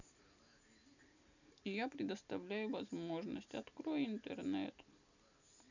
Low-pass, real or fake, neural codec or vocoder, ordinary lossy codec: 7.2 kHz; real; none; none